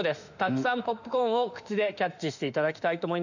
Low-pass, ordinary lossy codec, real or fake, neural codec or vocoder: 7.2 kHz; none; fake; autoencoder, 48 kHz, 32 numbers a frame, DAC-VAE, trained on Japanese speech